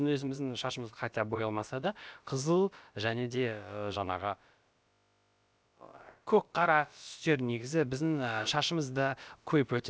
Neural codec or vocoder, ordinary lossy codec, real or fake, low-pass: codec, 16 kHz, about 1 kbps, DyCAST, with the encoder's durations; none; fake; none